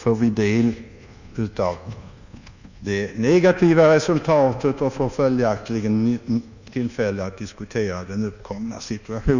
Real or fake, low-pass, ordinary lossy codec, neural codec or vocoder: fake; 7.2 kHz; none; codec, 24 kHz, 1.2 kbps, DualCodec